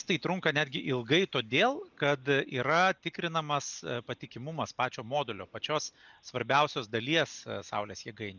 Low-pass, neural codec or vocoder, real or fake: 7.2 kHz; none; real